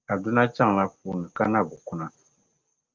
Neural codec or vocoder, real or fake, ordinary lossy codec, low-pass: none; real; Opus, 32 kbps; 7.2 kHz